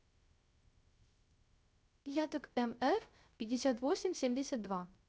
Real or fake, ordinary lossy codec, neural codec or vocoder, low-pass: fake; none; codec, 16 kHz, 0.3 kbps, FocalCodec; none